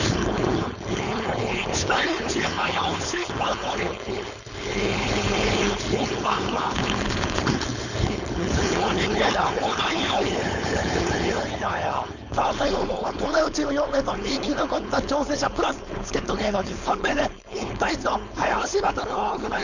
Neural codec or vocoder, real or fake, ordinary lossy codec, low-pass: codec, 16 kHz, 4.8 kbps, FACodec; fake; none; 7.2 kHz